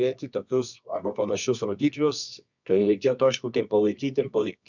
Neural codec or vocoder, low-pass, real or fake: codec, 24 kHz, 0.9 kbps, WavTokenizer, medium music audio release; 7.2 kHz; fake